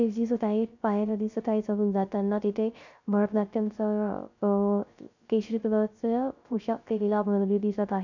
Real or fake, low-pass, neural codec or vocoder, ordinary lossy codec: fake; 7.2 kHz; codec, 16 kHz, 0.3 kbps, FocalCodec; none